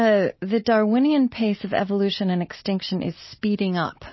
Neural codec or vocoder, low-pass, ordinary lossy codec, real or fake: none; 7.2 kHz; MP3, 24 kbps; real